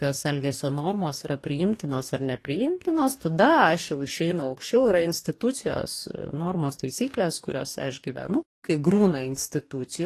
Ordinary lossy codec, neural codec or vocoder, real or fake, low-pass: AAC, 48 kbps; codec, 44.1 kHz, 2.6 kbps, DAC; fake; 14.4 kHz